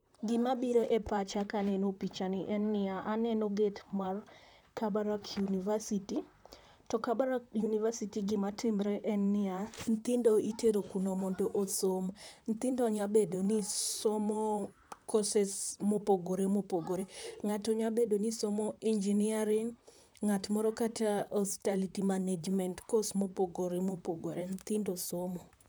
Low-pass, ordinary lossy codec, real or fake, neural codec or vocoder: none; none; fake; vocoder, 44.1 kHz, 128 mel bands, Pupu-Vocoder